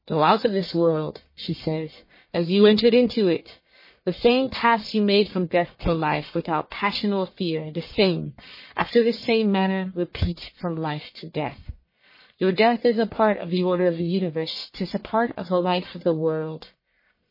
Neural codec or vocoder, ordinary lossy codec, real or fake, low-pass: codec, 44.1 kHz, 1.7 kbps, Pupu-Codec; MP3, 24 kbps; fake; 5.4 kHz